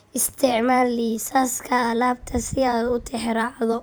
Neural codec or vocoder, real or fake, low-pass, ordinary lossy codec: vocoder, 44.1 kHz, 128 mel bands every 512 samples, BigVGAN v2; fake; none; none